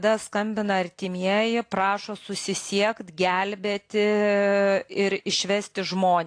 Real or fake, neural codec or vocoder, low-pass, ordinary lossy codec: real; none; 9.9 kHz; AAC, 48 kbps